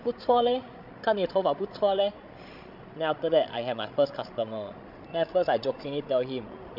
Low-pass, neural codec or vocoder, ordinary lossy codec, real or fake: 5.4 kHz; codec, 16 kHz, 16 kbps, FreqCodec, larger model; MP3, 48 kbps; fake